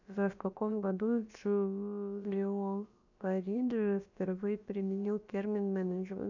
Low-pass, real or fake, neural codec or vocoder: 7.2 kHz; fake; codec, 16 kHz, about 1 kbps, DyCAST, with the encoder's durations